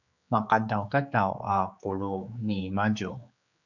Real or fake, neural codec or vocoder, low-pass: fake; codec, 16 kHz, 4 kbps, X-Codec, HuBERT features, trained on general audio; 7.2 kHz